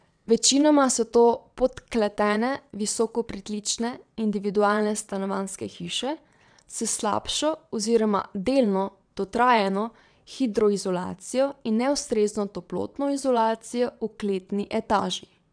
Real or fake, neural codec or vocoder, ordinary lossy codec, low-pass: fake; vocoder, 22.05 kHz, 80 mel bands, WaveNeXt; none; 9.9 kHz